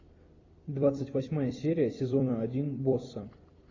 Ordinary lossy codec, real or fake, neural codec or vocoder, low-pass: AAC, 32 kbps; fake; vocoder, 44.1 kHz, 128 mel bands every 256 samples, BigVGAN v2; 7.2 kHz